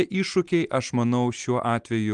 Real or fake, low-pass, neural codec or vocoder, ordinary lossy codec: real; 9.9 kHz; none; Opus, 24 kbps